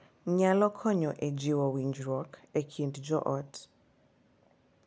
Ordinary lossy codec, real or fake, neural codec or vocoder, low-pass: none; real; none; none